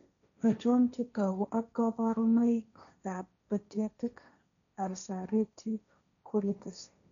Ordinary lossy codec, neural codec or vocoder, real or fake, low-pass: none; codec, 16 kHz, 1.1 kbps, Voila-Tokenizer; fake; 7.2 kHz